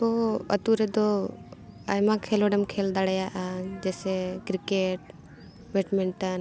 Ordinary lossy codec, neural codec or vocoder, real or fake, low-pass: none; none; real; none